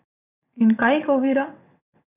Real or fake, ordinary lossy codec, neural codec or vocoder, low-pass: fake; none; vocoder, 22.05 kHz, 80 mel bands, WaveNeXt; 3.6 kHz